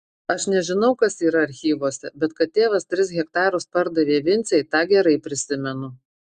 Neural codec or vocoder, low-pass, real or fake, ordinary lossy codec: none; 9.9 kHz; real; Opus, 64 kbps